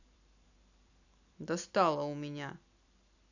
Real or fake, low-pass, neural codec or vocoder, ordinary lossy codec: fake; 7.2 kHz; vocoder, 44.1 kHz, 128 mel bands every 256 samples, BigVGAN v2; none